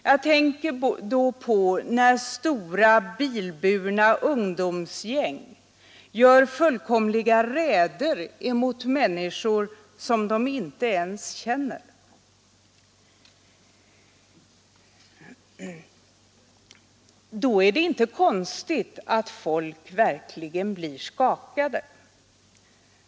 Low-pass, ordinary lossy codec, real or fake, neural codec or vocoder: none; none; real; none